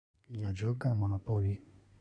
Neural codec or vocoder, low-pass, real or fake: codec, 24 kHz, 1 kbps, SNAC; 9.9 kHz; fake